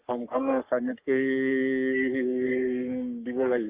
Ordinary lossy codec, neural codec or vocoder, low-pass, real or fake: Opus, 32 kbps; codec, 44.1 kHz, 3.4 kbps, Pupu-Codec; 3.6 kHz; fake